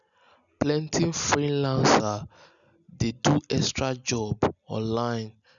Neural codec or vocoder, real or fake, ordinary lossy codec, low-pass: none; real; none; 7.2 kHz